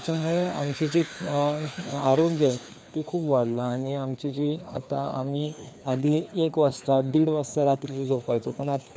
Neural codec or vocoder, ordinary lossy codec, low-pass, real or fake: codec, 16 kHz, 2 kbps, FreqCodec, larger model; none; none; fake